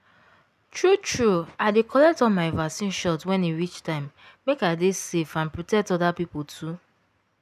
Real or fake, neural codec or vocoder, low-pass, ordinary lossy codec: real; none; 14.4 kHz; none